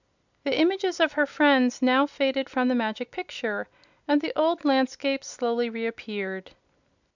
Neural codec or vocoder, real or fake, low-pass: none; real; 7.2 kHz